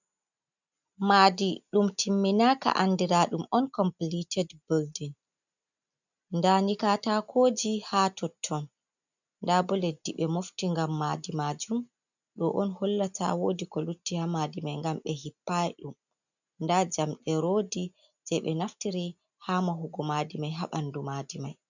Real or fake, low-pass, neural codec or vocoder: real; 7.2 kHz; none